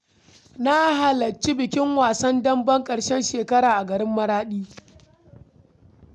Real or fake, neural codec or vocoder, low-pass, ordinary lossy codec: real; none; none; none